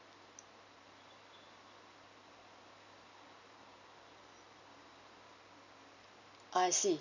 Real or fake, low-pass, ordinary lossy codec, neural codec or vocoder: real; 7.2 kHz; none; none